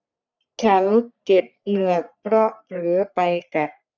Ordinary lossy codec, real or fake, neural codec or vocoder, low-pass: none; fake; codec, 44.1 kHz, 3.4 kbps, Pupu-Codec; 7.2 kHz